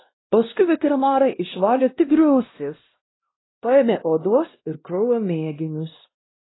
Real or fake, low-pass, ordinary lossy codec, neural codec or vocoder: fake; 7.2 kHz; AAC, 16 kbps; codec, 16 kHz, 1 kbps, X-Codec, WavLM features, trained on Multilingual LibriSpeech